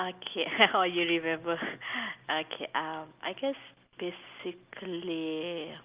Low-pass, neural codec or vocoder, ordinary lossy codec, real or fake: 3.6 kHz; none; Opus, 24 kbps; real